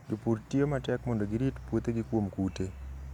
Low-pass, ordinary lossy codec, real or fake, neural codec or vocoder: 19.8 kHz; none; real; none